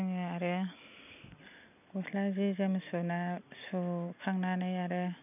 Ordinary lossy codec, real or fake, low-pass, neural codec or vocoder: none; real; 3.6 kHz; none